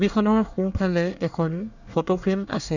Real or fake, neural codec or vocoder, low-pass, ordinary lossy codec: fake; codec, 24 kHz, 1 kbps, SNAC; 7.2 kHz; none